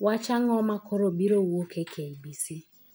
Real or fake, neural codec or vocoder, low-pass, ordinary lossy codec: real; none; none; none